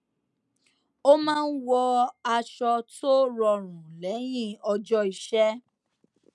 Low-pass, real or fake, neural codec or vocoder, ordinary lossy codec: none; real; none; none